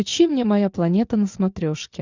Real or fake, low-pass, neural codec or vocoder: real; 7.2 kHz; none